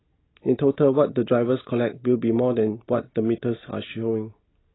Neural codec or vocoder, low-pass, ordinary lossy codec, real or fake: none; 7.2 kHz; AAC, 16 kbps; real